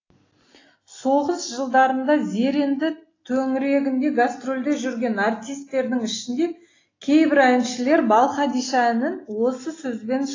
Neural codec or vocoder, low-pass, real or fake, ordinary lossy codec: none; 7.2 kHz; real; AAC, 32 kbps